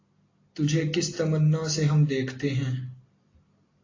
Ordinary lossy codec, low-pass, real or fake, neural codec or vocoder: AAC, 32 kbps; 7.2 kHz; real; none